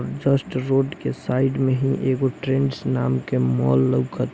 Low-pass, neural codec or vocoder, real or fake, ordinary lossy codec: none; none; real; none